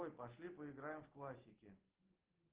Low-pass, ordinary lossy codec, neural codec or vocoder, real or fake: 3.6 kHz; Opus, 16 kbps; none; real